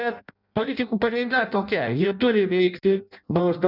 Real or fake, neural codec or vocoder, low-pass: fake; codec, 16 kHz in and 24 kHz out, 0.6 kbps, FireRedTTS-2 codec; 5.4 kHz